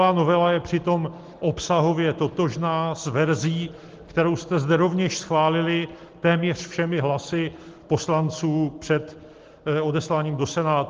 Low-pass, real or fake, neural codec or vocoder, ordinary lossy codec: 7.2 kHz; real; none; Opus, 16 kbps